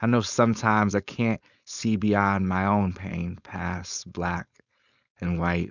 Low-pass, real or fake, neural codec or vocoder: 7.2 kHz; fake; codec, 16 kHz, 4.8 kbps, FACodec